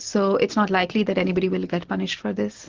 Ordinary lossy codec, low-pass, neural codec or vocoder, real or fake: Opus, 16 kbps; 7.2 kHz; none; real